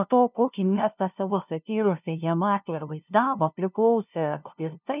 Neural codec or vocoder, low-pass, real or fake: codec, 16 kHz, 0.5 kbps, FunCodec, trained on LibriTTS, 25 frames a second; 3.6 kHz; fake